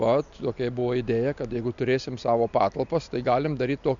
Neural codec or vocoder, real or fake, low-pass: none; real; 7.2 kHz